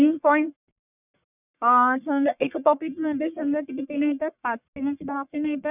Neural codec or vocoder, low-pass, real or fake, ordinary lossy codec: codec, 44.1 kHz, 1.7 kbps, Pupu-Codec; 3.6 kHz; fake; none